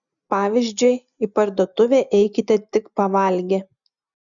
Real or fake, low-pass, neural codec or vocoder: real; 7.2 kHz; none